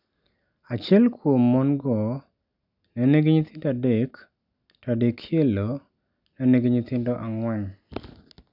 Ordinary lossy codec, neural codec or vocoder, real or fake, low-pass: none; none; real; 5.4 kHz